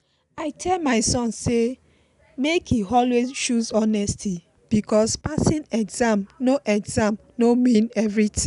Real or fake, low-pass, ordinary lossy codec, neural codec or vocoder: real; 10.8 kHz; none; none